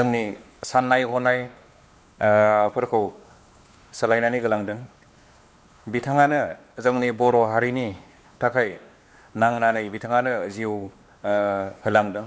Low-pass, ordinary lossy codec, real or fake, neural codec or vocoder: none; none; fake; codec, 16 kHz, 2 kbps, X-Codec, WavLM features, trained on Multilingual LibriSpeech